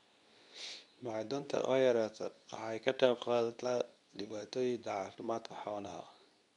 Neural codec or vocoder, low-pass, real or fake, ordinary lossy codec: codec, 24 kHz, 0.9 kbps, WavTokenizer, medium speech release version 2; 10.8 kHz; fake; none